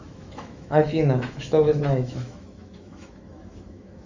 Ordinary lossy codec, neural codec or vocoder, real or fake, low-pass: Opus, 64 kbps; autoencoder, 48 kHz, 128 numbers a frame, DAC-VAE, trained on Japanese speech; fake; 7.2 kHz